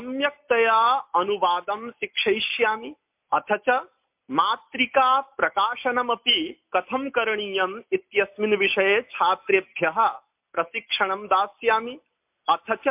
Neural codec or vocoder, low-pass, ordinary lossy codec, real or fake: none; 3.6 kHz; MP3, 32 kbps; real